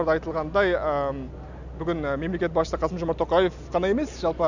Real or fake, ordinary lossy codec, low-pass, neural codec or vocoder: real; none; 7.2 kHz; none